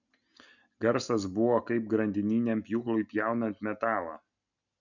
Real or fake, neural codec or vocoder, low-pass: real; none; 7.2 kHz